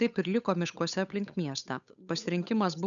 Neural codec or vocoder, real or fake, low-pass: codec, 16 kHz, 8 kbps, FunCodec, trained on Chinese and English, 25 frames a second; fake; 7.2 kHz